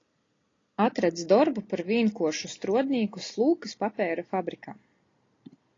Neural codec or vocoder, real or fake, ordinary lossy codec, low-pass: none; real; AAC, 32 kbps; 7.2 kHz